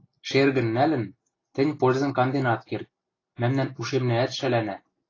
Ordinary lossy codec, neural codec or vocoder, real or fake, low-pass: AAC, 32 kbps; none; real; 7.2 kHz